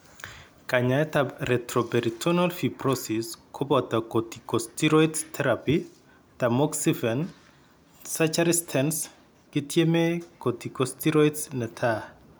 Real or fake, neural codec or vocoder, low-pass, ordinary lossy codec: real; none; none; none